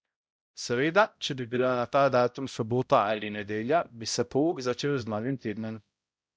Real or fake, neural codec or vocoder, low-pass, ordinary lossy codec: fake; codec, 16 kHz, 0.5 kbps, X-Codec, HuBERT features, trained on balanced general audio; none; none